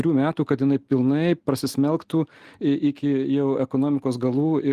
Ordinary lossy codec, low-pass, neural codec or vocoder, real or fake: Opus, 24 kbps; 14.4 kHz; none; real